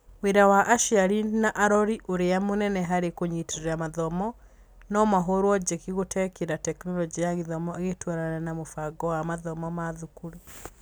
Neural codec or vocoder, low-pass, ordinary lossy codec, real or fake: none; none; none; real